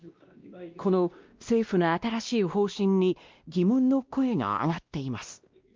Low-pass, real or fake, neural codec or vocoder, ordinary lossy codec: 7.2 kHz; fake; codec, 16 kHz, 1 kbps, X-Codec, WavLM features, trained on Multilingual LibriSpeech; Opus, 32 kbps